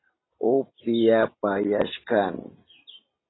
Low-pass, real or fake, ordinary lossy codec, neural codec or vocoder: 7.2 kHz; real; AAC, 16 kbps; none